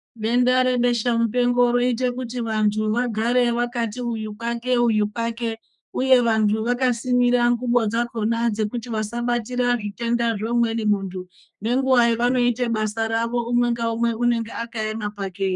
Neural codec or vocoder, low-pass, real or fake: codec, 32 kHz, 1.9 kbps, SNAC; 10.8 kHz; fake